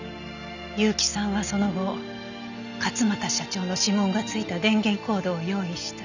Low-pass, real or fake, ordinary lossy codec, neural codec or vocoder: 7.2 kHz; real; none; none